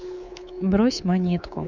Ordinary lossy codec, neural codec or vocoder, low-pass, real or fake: none; codec, 24 kHz, 3.1 kbps, DualCodec; 7.2 kHz; fake